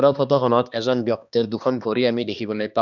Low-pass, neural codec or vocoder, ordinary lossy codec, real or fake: 7.2 kHz; codec, 16 kHz, 2 kbps, X-Codec, HuBERT features, trained on balanced general audio; none; fake